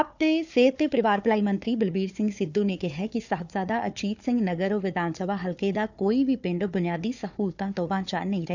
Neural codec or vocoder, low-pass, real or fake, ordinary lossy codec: codec, 16 kHz, 4 kbps, FunCodec, trained on Chinese and English, 50 frames a second; 7.2 kHz; fake; none